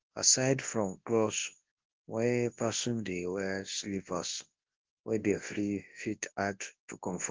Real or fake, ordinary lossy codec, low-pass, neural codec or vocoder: fake; Opus, 16 kbps; 7.2 kHz; codec, 24 kHz, 0.9 kbps, WavTokenizer, large speech release